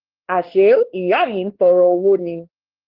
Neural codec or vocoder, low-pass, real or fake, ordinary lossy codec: codec, 16 kHz, 2 kbps, X-Codec, WavLM features, trained on Multilingual LibriSpeech; 5.4 kHz; fake; Opus, 16 kbps